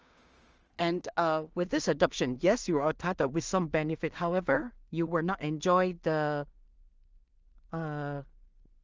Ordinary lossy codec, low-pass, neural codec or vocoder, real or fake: Opus, 24 kbps; 7.2 kHz; codec, 16 kHz in and 24 kHz out, 0.4 kbps, LongCat-Audio-Codec, two codebook decoder; fake